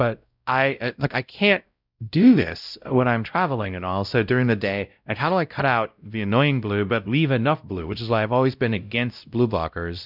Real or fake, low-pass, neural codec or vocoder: fake; 5.4 kHz; codec, 16 kHz, 0.5 kbps, X-Codec, WavLM features, trained on Multilingual LibriSpeech